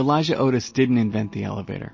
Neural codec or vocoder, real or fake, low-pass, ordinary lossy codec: none; real; 7.2 kHz; MP3, 32 kbps